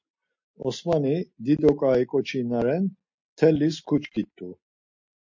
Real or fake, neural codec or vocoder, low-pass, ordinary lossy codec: real; none; 7.2 kHz; MP3, 48 kbps